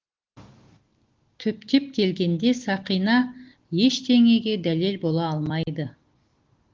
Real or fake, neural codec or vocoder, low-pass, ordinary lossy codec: real; none; 7.2 kHz; Opus, 16 kbps